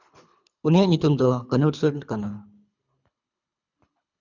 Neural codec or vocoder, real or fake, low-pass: codec, 24 kHz, 3 kbps, HILCodec; fake; 7.2 kHz